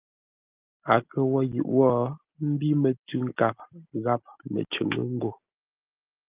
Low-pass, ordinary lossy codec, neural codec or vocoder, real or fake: 3.6 kHz; Opus, 32 kbps; none; real